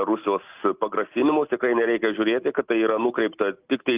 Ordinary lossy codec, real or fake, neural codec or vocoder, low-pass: Opus, 32 kbps; real; none; 3.6 kHz